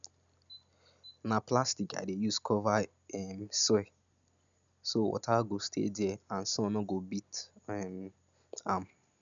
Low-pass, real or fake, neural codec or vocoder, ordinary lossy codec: 7.2 kHz; real; none; none